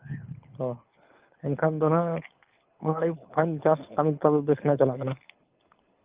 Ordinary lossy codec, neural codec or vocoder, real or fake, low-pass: Opus, 32 kbps; codec, 24 kHz, 6 kbps, HILCodec; fake; 3.6 kHz